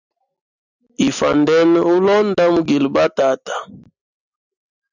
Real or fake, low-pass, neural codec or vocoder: real; 7.2 kHz; none